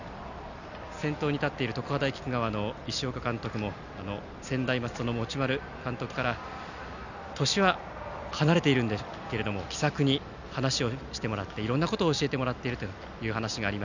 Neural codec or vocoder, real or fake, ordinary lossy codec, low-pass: none; real; none; 7.2 kHz